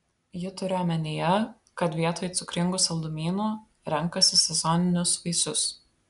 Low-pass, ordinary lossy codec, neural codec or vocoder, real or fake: 10.8 kHz; MP3, 96 kbps; none; real